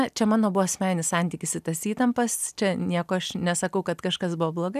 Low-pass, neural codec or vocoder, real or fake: 14.4 kHz; none; real